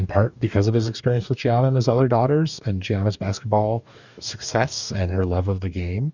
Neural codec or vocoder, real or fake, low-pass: codec, 44.1 kHz, 2.6 kbps, DAC; fake; 7.2 kHz